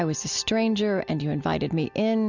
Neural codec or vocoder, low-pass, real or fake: none; 7.2 kHz; real